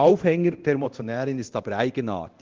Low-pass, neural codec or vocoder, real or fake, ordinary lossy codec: 7.2 kHz; codec, 24 kHz, 0.9 kbps, DualCodec; fake; Opus, 16 kbps